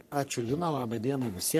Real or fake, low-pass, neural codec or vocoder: fake; 14.4 kHz; codec, 44.1 kHz, 3.4 kbps, Pupu-Codec